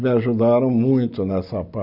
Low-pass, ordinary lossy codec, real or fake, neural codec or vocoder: 5.4 kHz; none; real; none